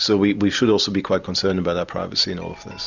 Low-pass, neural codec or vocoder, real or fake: 7.2 kHz; none; real